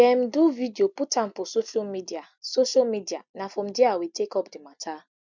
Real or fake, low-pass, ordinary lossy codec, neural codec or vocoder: real; 7.2 kHz; none; none